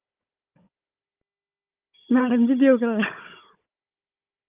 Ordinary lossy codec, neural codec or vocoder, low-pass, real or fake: Opus, 24 kbps; codec, 16 kHz, 16 kbps, FunCodec, trained on Chinese and English, 50 frames a second; 3.6 kHz; fake